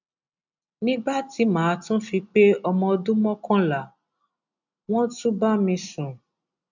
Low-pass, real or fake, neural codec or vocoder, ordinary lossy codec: 7.2 kHz; real; none; none